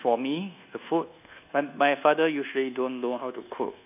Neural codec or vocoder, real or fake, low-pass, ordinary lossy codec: codec, 24 kHz, 1.2 kbps, DualCodec; fake; 3.6 kHz; none